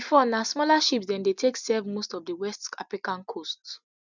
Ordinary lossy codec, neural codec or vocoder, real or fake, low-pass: none; none; real; 7.2 kHz